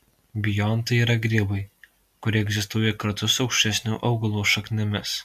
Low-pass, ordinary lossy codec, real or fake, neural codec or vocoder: 14.4 kHz; AAC, 96 kbps; real; none